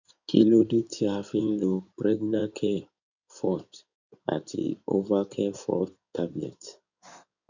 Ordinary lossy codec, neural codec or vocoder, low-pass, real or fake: none; codec, 16 kHz in and 24 kHz out, 2.2 kbps, FireRedTTS-2 codec; 7.2 kHz; fake